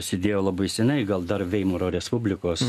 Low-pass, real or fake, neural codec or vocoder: 14.4 kHz; real; none